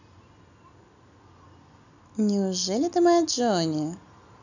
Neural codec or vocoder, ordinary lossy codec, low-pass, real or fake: none; none; 7.2 kHz; real